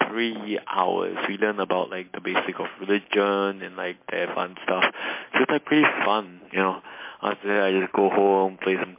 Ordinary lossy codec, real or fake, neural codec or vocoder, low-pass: MP3, 24 kbps; real; none; 3.6 kHz